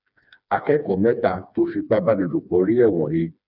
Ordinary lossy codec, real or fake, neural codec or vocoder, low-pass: none; fake; codec, 16 kHz, 2 kbps, FreqCodec, smaller model; 5.4 kHz